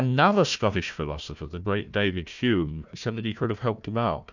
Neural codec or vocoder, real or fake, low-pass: codec, 16 kHz, 1 kbps, FunCodec, trained on Chinese and English, 50 frames a second; fake; 7.2 kHz